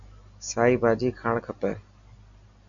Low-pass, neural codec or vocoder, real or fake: 7.2 kHz; none; real